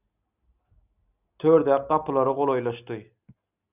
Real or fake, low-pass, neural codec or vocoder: real; 3.6 kHz; none